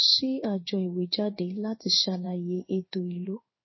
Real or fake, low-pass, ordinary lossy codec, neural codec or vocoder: fake; 7.2 kHz; MP3, 24 kbps; codec, 16 kHz in and 24 kHz out, 1 kbps, XY-Tokenizer